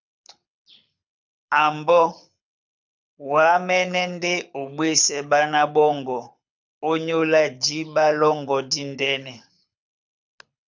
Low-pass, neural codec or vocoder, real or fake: 7.2 kHz; codec, 24 kHz, 6 kbps, HILCodec; fake